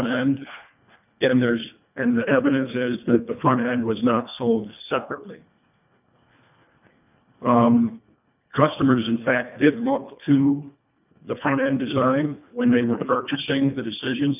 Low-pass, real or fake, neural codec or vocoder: 3.6 kHz; fake; codec, 24 kHz, 1.5 kbps, HILCodec